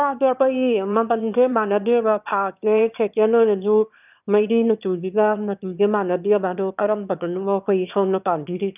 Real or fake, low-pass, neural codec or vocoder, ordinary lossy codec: fake; 3.6 kHz; autoencoder, 22.05 kHz, a latent of 192 numbers a frame, VITS, trained on one speaker; none